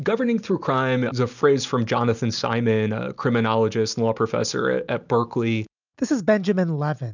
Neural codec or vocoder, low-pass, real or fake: none; 7.2 kHz; real